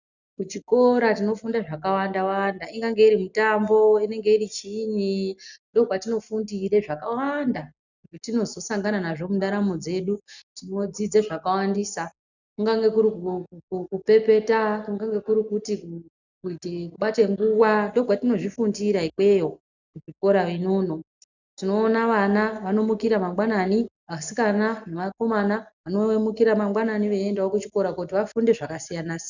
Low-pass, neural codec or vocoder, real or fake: 7.2 kHz; none; real